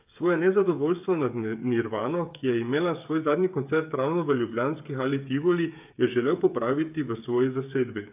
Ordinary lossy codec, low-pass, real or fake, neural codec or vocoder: AAC, 32 kbps; 3.6 kHz; fake; codec, 16 kHz, 8 kbps, FreqCodec, smaller model